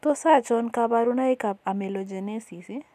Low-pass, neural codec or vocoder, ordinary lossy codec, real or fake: 14.4 kHz; none; none; real